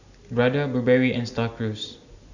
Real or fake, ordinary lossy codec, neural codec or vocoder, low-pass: real; none; none; 7.2 kHz